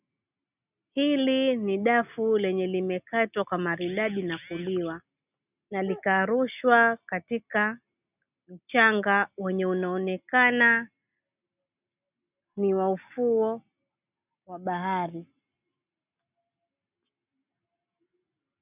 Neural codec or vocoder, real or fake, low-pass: none; real; 3.6 kHz